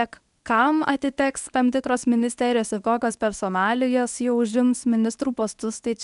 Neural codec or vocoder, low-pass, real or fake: codec, 24 kHz, 0.9 kbps, WavTokenizer, medium speech release version 1; 10.8 kHz; fake